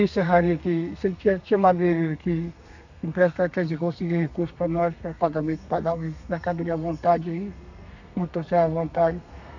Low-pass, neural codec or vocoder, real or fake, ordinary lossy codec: 7.2 kHz; codec, 32 kHz, 1.9 kbps, SNAC; fake; none